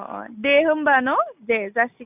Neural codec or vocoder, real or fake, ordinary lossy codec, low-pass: none; real; none; 3.6 kHz